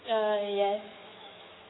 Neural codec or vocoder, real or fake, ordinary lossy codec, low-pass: autoencoder, 48 kHz, 128 numbers a frame, DAC-VAE, trained on Japanese speech; fake; AAC, 16 kbps; 7.2 kHz